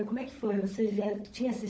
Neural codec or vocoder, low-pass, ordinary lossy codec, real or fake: codec, 16 kHz, 16 kbps, FunCodec, trained on LibriTTS, 50 frames a second; none; none; fake